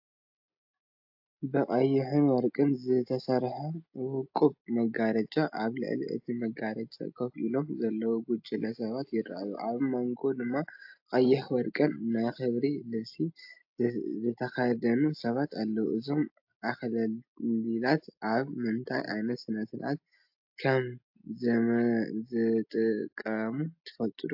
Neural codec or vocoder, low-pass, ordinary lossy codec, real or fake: none; 5.4 kHz; AAC, 48 kbps; real